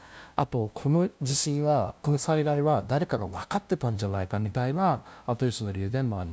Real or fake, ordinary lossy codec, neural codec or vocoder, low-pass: fake; none; codec, 16 kHz, 0.5 kbps, FunCodec, trained on LibriTTS, 25 frames a second; none